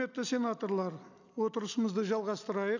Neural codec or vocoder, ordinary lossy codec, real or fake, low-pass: none; none; real; 7.2 kHz